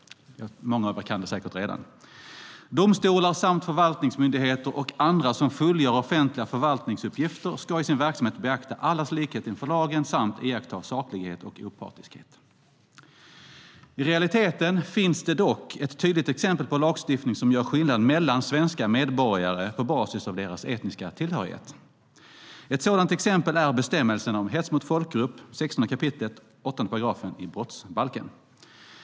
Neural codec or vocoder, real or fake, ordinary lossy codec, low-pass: none; real; none; none